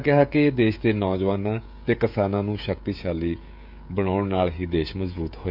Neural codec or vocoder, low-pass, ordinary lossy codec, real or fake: codec, 16 kHz, 16 kbps, FreqCodec, smaller model; 5.4 kHz; none; fake